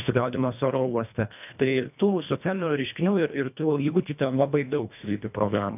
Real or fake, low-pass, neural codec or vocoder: fake; 3.6 kHz; codec, 24 kHz, 1.5 kbps, HILCodec